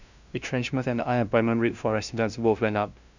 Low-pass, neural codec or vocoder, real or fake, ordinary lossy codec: 7.2 kHz; codec, 16 kHz, 0.5 kbps, FunCodec, trained on LibriTTS, 25 frames a second; fake; none